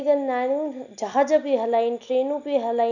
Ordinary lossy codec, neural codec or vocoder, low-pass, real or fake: none; none; 7.2 kHz; real